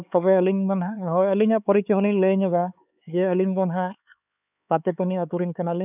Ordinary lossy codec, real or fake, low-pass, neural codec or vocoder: none; fake; 3.6 kHz; codec, 16 kHz, 4 kbps, X-Codec, HuBERT features, trained on LibriSpeech